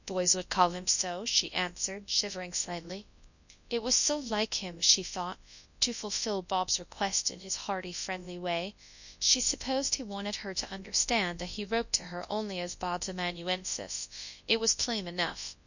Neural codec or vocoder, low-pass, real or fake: codec, 24 kHz, 0.9 kbps, WavTokenizer, large speech release; 7.2 kHz; fake